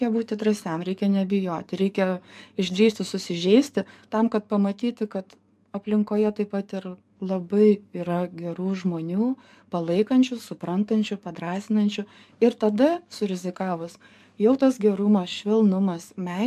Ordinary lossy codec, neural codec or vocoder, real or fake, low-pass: MP3, 96 kbps; codec, 44.1 kHz, 7.8 kbps, DAC; fake; 14.4 kHz